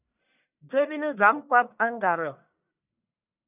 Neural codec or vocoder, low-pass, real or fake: codec, 44.1 kHz, 1.7 kbps, Pupu-Codec; 3.6 kHz; fake